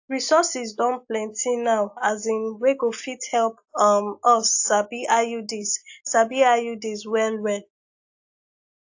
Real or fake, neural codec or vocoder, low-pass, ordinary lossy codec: real; none; 7.2 kHz; AAC, 48 kbps